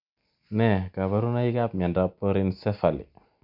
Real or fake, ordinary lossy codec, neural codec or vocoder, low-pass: real; none; none; 5.4 kHz